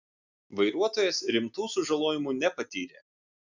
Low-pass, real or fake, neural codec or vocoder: 7.2 kHz; real; none